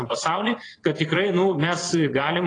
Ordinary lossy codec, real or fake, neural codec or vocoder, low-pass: AAC, 32 kbps; fake; vocoder, 22.05 kHz, 80 mel bands, Vocos; 9.9 kHz